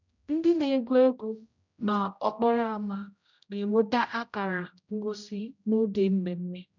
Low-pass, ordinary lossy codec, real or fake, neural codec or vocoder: 7.2 kHz; none; fake; codec, 16 kHz, 0.5 kbps, X-Codec, HuBERT features, trained on general audio